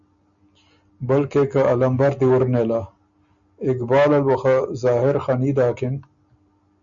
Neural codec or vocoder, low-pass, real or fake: none; 7.2 kHz; real